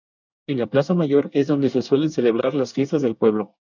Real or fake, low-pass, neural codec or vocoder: fake; 7.2 kHz; codec, 24 kHz, 1 kbps, SNAC